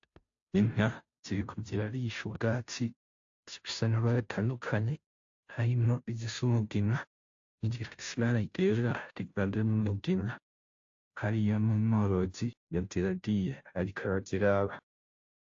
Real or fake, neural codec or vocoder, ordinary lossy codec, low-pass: fake; codec, 16 kHz, 0.5 kbps, FunCodec, trained on Chinese and English, 25 frames a second; AAC, 48 kbps; 7.2 kHz